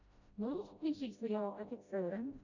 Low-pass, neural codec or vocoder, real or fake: 7.2 kHz; codec, 16 kHz, 0.5 kbps, FreqCodec, smaller model; fake